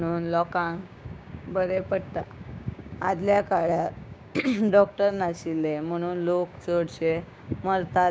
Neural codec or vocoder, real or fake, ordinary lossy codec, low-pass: codec, 16 kHz, 6 kbps, DAC; fake; none; none